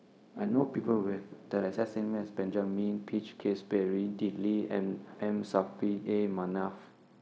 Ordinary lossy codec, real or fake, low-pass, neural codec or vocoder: none; fake; none; codec, 16 kHz, 0.4 kbps, LongCat-Audio-Codec